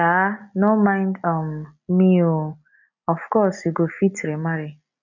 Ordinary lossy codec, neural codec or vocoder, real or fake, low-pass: none; none; real; 7.2 kHz